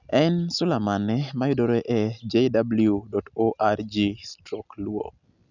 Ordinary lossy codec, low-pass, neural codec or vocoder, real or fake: none; 7.2 kHz; none; real